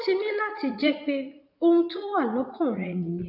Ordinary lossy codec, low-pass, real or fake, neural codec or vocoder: none; 5.4 kHz; fake; vocoder, 44.1 kHz, 80 mel bands, Vocos